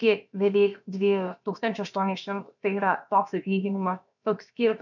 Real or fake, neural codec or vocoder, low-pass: fake; codec, 16 kHz, about 1 kbps, DyCAST, with the encoder's durations; 7.2 kHz